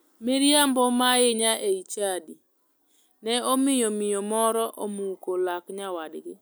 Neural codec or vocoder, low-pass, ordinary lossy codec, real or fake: none; none; none; real